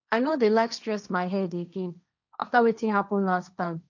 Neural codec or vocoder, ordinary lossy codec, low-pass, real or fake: codec, 16 kHz, 1.1 kbps, Voila-Tokenizer; none; 7.2 kHz; fake